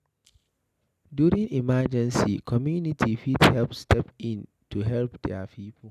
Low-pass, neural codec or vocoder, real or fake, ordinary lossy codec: 14.4 kHz; none; real; none